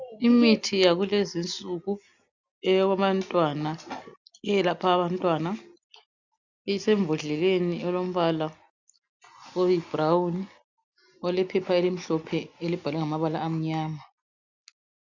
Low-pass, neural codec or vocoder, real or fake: 7.2 kHz; none; real